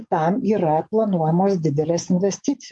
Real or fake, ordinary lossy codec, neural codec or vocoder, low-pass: fake; MP3, 48 kbps; codec, 44.1 kHz, 7.8 kbps, DAC; 10.8 kHz